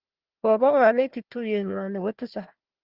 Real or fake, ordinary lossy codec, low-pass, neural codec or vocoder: fake; Opus, 16 kbps; 5.4 kHz; codec, 16 kHz, 1 kbps, FunCodec, trained on Chinese and English, 50 frames a second